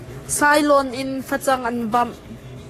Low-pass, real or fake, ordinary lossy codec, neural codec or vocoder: 14.4 kHz; fake; AAC, 48 kbps; codec, 44.1 kHz, 7.8 kbps, Pupu-Codec